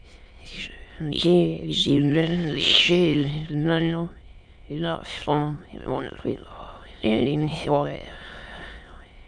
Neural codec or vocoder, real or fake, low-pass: autoencoder, 22.05 kHz, a latent of 192 numbers a frame, VITS, trained on many speakers; fake; 9.9 kHz